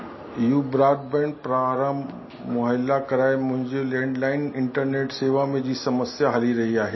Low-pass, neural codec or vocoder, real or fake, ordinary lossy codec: 7.2 kHz; none; real; MP3, 24 kbps